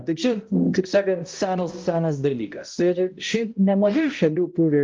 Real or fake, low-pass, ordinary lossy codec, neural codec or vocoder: fake; 7.2 kHz; Opus, 24 kbps; codec, 16 kHz, 0.5 kbps, X-Codec, HuBERT features, trained on balanced general audio